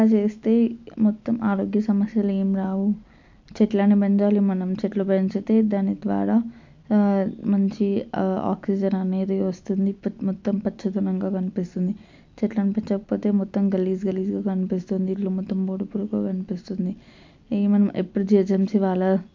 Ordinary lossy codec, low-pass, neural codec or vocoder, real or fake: MP3, 64 kbps; 7.2 kHz; none; real